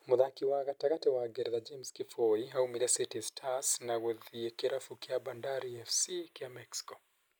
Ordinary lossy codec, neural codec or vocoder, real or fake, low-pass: none; none; real; none